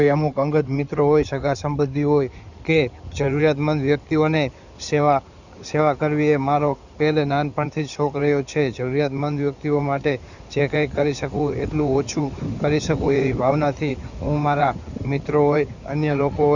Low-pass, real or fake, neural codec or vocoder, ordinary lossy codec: 7.2 kHz; fake; codec, 16 kHz in and 24 kHz out, 2.2 kbps, FireRedTTS-2 codec; none